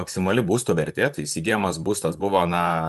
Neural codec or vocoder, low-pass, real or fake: vocoder, 44.1 kHz, 128 mel bands, Pupu-Vocoder; 14.4 kHz; fake